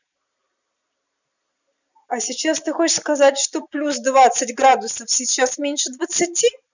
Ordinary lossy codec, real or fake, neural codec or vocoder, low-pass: none; real; none; 7.2 kHz